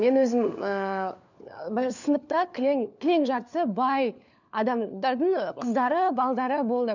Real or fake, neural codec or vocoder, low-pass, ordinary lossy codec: fake; codec, 16 kHz, 4 kbps, FunCodec, trained on LibriTTS, 50 frames a second; 7.2 kHz; none